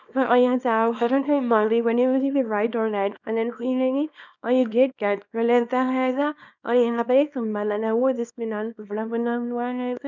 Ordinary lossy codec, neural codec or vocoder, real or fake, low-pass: none; codec, 24 kHz, 0.9 kbps, WavTokenizer, small release; fake; 7.2 kHz